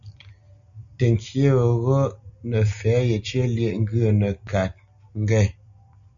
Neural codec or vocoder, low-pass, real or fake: none; 7.2 kHz; real